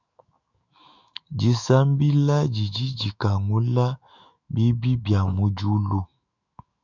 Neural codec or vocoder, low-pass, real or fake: autoencoder, 48 kHz, 128 numbers a frame, DAC-VAE, trained on Japanese speech; 7.2 kHz; fake